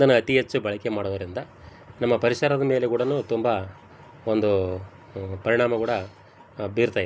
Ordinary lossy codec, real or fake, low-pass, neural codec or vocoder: none; real; none; none